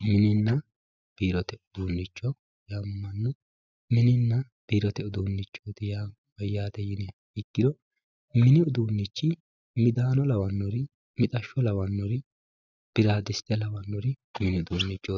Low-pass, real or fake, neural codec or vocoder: 7.2 kHz; real; none